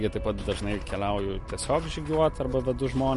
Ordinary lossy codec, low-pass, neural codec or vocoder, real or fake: MP3, 48 kbps; 14.4 kHz; none; real